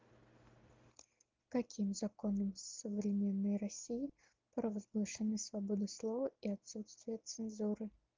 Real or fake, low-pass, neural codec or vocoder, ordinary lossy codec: real; 7.2 kHz; none; Opus, 32 kbps